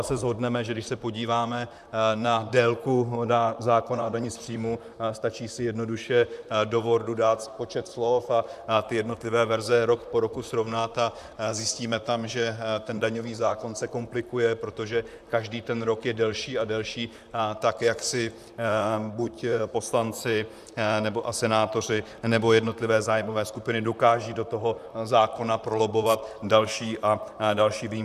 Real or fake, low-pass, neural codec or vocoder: fake; 14.4 kHz; vocoder, 44.1 kHz, 128 mel bands, Pupu-Vocoder